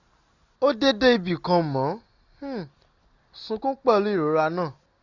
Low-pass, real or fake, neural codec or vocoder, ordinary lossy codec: 7.2 kHz; real; none; none